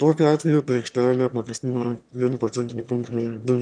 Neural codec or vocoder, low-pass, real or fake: autoencoder, 22.05 kHz, a latent of 192 numbers a frame, VITS, trained on one speaker; 9.9 kHz; fake